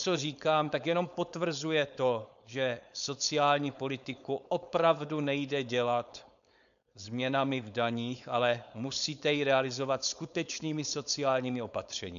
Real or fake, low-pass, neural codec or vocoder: fake; 7.2 kHz; codec, 16 kHz, 4.8 kbps, FACodec